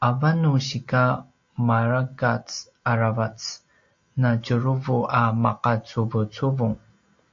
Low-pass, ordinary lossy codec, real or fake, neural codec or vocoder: 7.2 kHz; AAC, 48 kbps; real; none